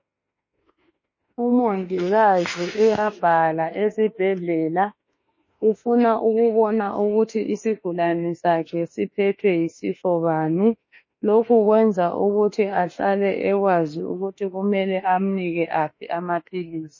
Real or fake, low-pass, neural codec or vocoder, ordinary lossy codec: fake; 7.2 kHz; autoencoder, 48 kHz, 32 numbers a frame, DAC-VAE, trained on Japanese speech; MP3, 32 kbps